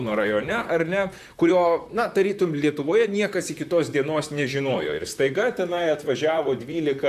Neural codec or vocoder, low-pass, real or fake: vocoder, 44.1 kHz, 128 mel bands, Pupu-Vocoder; 14.4 kHz; fake